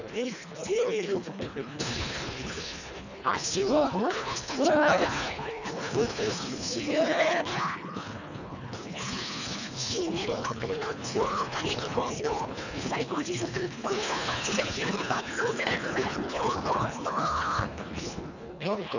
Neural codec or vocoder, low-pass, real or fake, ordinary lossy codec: codec, 24 kHz, 1.5 kbps, HILCodec; 7.2 kHz; fake; none